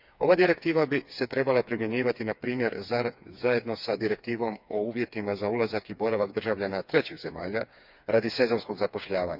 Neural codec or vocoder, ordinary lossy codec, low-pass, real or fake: codec, 16 kHz, 4 kbps, FreqCodec, smaller model; none; 5.4 kHz; fake